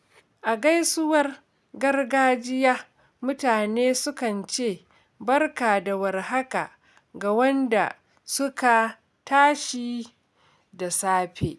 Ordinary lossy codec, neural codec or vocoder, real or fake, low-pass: none; none; real; none